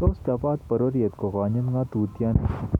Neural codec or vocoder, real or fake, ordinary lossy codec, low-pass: none; real; none; 19.8 kHz